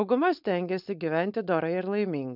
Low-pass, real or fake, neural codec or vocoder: 5.4 kHz; fake; codec, 16 kHz, 4.8 kbps, FACodec